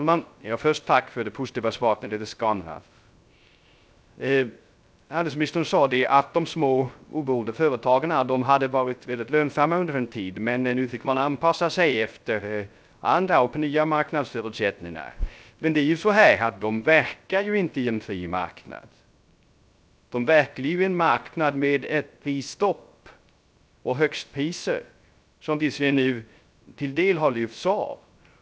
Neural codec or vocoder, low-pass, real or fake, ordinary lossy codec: codec, 16 kHz, 0.3 kbps, FocalCodec; none; fake; none